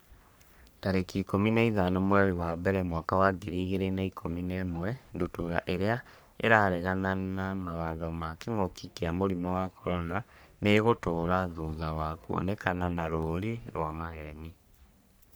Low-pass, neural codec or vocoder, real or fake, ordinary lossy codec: none; codec, 44.1 kHz, 3.4 kbps, Pupu-Codec; fake; none